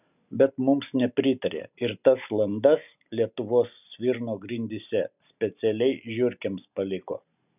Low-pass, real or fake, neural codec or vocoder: 3.6 kHz; real; none